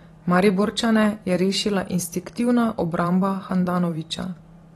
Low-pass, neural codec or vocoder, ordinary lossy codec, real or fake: 19.8 kHz; vocoder, 44.1 kHz, 128 mel bands every 512 samples, BigVGAN v2; AAC, 32 kbps; fake